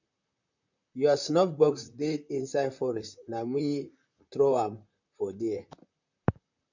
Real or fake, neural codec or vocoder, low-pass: fake; vocoder, 44.1 kHz, 128 mel bands, Pupu-Vocoder; 7.2 kHz